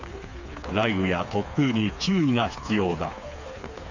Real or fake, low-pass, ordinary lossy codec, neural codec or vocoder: fake; 7.2 kHz; none; codec, 16 kHz, 4 kbps, FreqCodec, smaller model